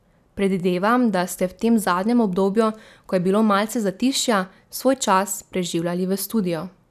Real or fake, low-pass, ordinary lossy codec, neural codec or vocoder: real; 14.4 kHz; none; none